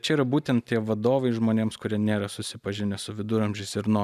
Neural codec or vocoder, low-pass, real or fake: none; 14.4 kHz; real